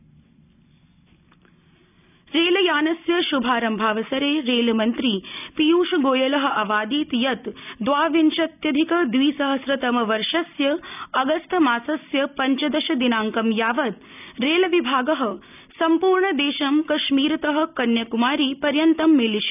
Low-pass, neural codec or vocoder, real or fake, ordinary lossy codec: 3.6 kHz; none; real; none